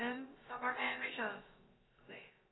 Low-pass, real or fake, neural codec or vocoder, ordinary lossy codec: 7.2 kHz; fake; codec, 16 kHz, about 1 kbps, DyCAST, with the encoder's durations; AAC, 16 kbps